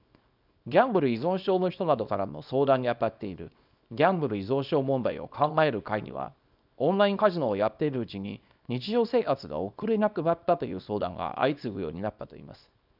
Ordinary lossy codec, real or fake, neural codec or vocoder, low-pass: none; fake; codec, 24 kHz, 0.9 kbps, WavTokenizer, small release; 5.4 kHz